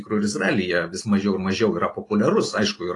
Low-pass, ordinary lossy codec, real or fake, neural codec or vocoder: 10.8 kHz; AAC, 32 kbps; real; none